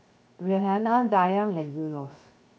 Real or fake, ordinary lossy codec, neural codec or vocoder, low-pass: fake; none; codec, 16 kHz, 0.7 kbps, FocalCodec; none